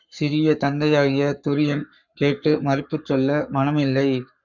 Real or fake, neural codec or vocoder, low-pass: fake; codec, 44.1 kHz, 7.8 kbps, Pupu-Codec; 7.2 kHz